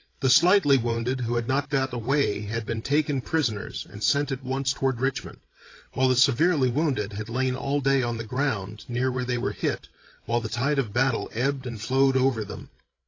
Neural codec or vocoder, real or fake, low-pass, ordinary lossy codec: codec, 16 kHz, 16 kbps, FreqCodec, larger model; fake; 7.2 kHz; AAC, 32 kbps